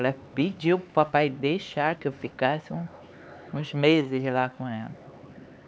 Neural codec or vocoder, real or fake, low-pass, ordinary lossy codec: codec, 16 kHz, 4 kbps, X-Codec, HuBERT features, trained on LibriSpeech; fake; none; none